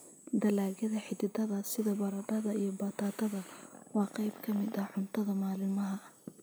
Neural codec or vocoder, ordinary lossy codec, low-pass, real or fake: none; none; none; real